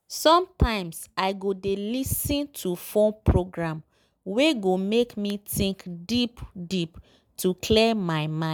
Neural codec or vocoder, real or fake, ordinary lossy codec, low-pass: none; real; none; none